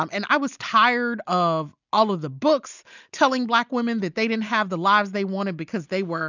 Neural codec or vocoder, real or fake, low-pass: none; real; 7.2 kHz